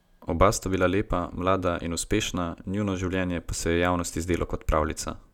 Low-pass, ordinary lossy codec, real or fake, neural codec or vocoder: 19.8 kHz; none; real; none